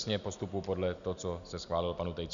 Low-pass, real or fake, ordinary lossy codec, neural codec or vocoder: 7.2 kHz; real; MP3, 96 kbps; none